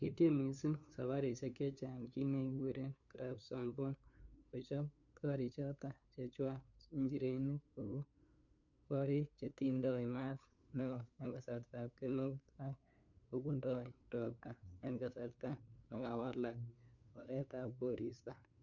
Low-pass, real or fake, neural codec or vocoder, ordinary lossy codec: 7.2 kHz; fake; codec, 16 kHz, 2 kbps, FunCodec, trained on LibriTTS, 25 frames a second; none